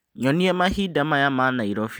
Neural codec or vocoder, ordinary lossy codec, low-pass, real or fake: none; none; none; real